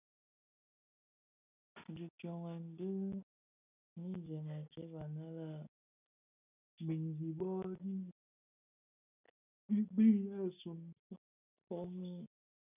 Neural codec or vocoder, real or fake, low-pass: none; real; 3.6 kHz